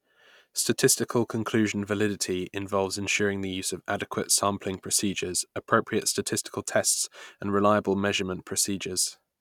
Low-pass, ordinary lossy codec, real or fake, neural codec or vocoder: 19.8 kHz; none; real; none